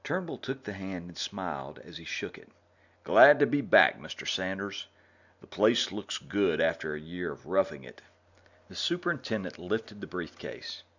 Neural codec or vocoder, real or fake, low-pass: none; real; 7.2 kHz